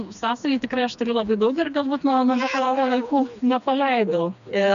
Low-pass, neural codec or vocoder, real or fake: 7.2 kHz; codec, 16 kHz, 2 kbps, FreqCodec, smaller model; fake